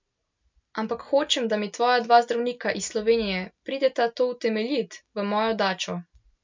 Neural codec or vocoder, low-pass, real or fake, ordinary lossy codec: none; 7.2 kHz; real; MP3, 64 kbps